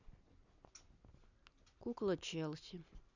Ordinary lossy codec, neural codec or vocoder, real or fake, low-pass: none; none; real; 7.2 kHz